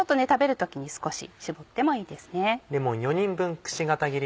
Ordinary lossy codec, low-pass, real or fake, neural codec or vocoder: none; none; real; none